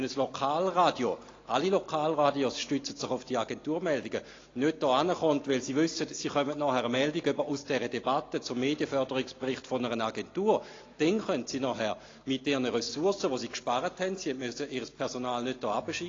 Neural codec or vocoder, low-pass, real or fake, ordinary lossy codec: none; 7.2 kHz; real; AAC, 32 kbps